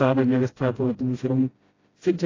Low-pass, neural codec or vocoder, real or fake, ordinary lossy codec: 7.2 kHz; codec, 16 kHz, 0.5 kbps, FreqCodec, smaller model; fake; AAC, 32 kbps